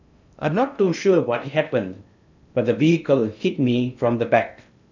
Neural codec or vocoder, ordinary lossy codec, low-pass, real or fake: codec, 16 kHz in and 24 kHz out, 0.6 kbps, FocalCodec, streaming, 2048 codes; none; 7.2 kHz; fake